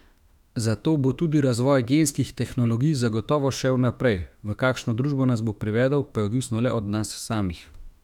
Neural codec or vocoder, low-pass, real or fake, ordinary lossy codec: autoencoder, 48 kHz, 32 numbers a frame, DAC-VAE, trained on Japanese speech; 19.8 kHz; fake; none